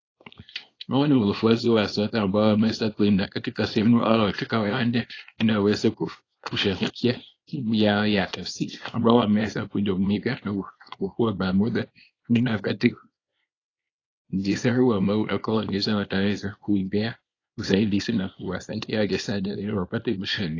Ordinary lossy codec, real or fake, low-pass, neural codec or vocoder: AAC, 32 kbps; fake; 7.2 kHz; codec, 24 kHz, 0.9 kbps, WavTokenizer, small release